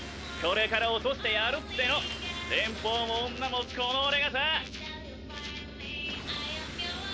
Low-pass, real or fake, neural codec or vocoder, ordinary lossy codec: none; real; none; none